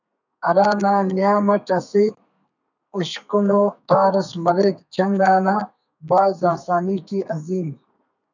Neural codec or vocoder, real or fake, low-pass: codec, 32 kHz, 1.9 kbps, SNAC; fake; 7.2 kHz